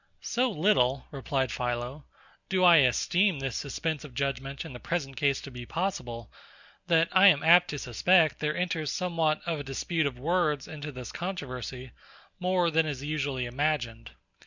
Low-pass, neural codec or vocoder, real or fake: 7.2 kHz; none; real